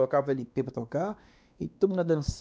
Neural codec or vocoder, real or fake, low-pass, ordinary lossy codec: codec, 16 kHz, 2 kbps, X-Codec, WavLM features, trained on Multilingual LibriSpeech; fake; none; none